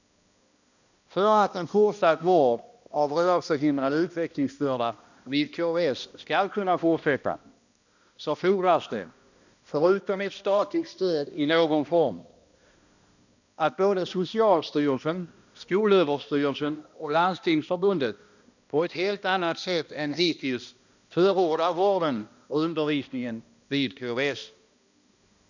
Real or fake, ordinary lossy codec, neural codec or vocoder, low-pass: fake; none; codec, 16 kHz, 1 kbps, X-Codec, HuBERT features, trained on balanced general audio; 7.2 kHz